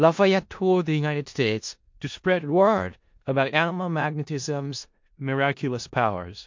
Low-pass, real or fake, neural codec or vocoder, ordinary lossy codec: 7.2 kHz; fake; codec, 16 kHz in and 24 kHz out, 0.4 kbps, LongCat-Audio-Codec, four codebook decoder; MP3, 48 kbps